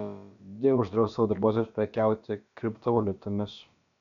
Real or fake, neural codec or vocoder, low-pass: fake; codec, 16 kHz, about 1 kbps, DyCAST, with the encoder's durations; 7.2 kHz